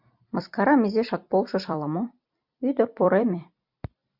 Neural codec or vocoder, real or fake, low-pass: vocoder, 44.1 kHz, 128 mel bands every 256 samples, BigVGAN v2; fake; 5.4 kHz